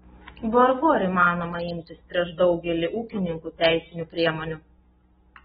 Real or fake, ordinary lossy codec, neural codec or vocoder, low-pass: real; AAC, 16 kbps; none; 7.2 kHz